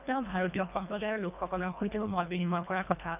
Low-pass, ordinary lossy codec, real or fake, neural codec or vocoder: 3.6 kHz; none; fake; codec, 24 kHz, 1.5 kbps, HILCodec